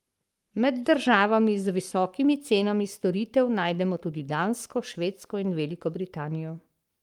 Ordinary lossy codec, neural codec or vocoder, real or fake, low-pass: Opus, 32 kbps; codec, 44.1 kHz, 7.8 kbps, Pupu-Codec; fake; 19.8 kHz